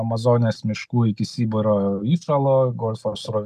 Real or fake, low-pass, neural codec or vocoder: real; 14.4 kHz; none